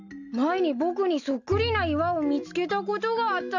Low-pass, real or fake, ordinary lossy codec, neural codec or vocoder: 7.2 kHz; real; none; none